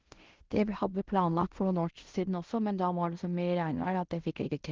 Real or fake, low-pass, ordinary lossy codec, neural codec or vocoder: fake; 7.2 kHz; Opus, 16 kbps; codec, 16 kHz in and 24 kHz out, 0.9 kbps, LongCat-Audio-Codec, fine tuned four codebook decoder